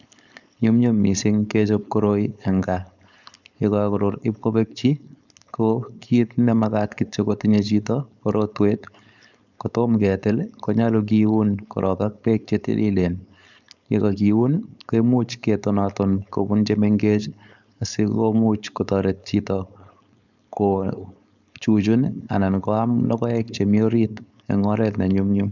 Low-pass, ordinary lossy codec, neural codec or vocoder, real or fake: 7.2 kHz; none; codec, 16 kHz, 4.8 kbps, FACodec; fake